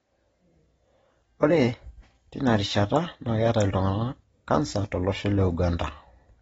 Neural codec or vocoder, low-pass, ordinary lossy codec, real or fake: none; 14.4 kHz; AAC, 24 kbps; real